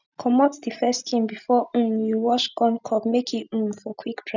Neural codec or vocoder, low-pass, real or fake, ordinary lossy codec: none; 7.2 kHz; real; none